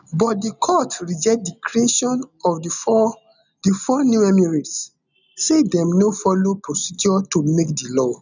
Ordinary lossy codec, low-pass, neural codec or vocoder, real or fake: none; 7.2 kHz; none; real